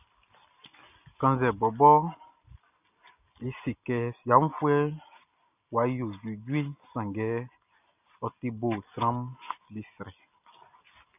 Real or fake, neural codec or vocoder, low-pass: real; none; 3.6 kHz